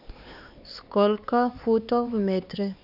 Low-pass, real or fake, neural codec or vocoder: 5.4 kHz; fake; codec, 16 kHz, 4 kbps, X-Codec, HuBERT features, trained on LibriSpeech